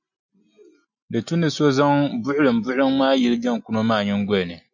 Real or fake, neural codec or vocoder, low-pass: real; none; 7.2 kHz